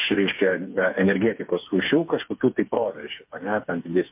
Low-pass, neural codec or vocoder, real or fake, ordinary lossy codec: 3.6 kHz; codec, 16 kHz, 4 kbps, FreqCodec, smaller model; fake; MP3, 32 kbps